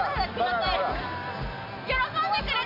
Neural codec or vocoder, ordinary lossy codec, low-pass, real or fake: none; AAC, 48 kbps; 5.4 kHz; real